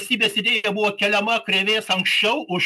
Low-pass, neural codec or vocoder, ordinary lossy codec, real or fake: 14.4 kHz; none; MP3, 96 kbps; real